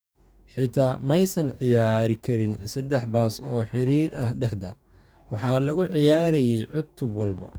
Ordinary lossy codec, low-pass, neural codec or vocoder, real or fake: none; none; codec, 44.1 kHz, 2.6 kbps, DAC; fake